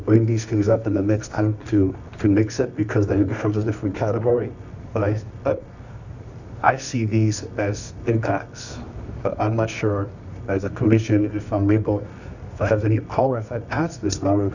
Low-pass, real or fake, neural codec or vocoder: 7.2 kHz; fake; codec, 24 kHz, 0.9 kbps, WavTokenizer, medium music audio release